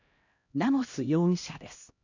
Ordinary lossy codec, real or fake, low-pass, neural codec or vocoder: none; fake; 7.2 kHz; codec, 16 kHz, 1 kbps, X-Codec, HuBERT features, trained on LibriSpeech